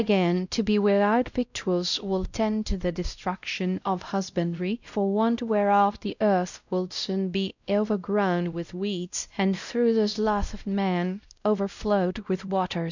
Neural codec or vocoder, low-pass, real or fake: codec, 16 kHz, 0.5 kbps, X-Codec, WavLM features, trained on Multilingual LibriSpeech; 7.2 kHz; fake